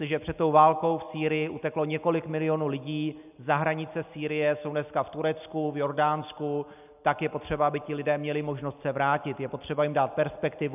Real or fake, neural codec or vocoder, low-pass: real; none; 3.6 kHz